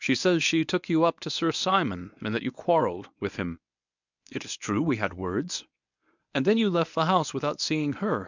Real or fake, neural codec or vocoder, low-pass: fake; codec, 24 kHz, 0.9 kbps, WavTokenizer, medium speech release version 1; 7.2 kHz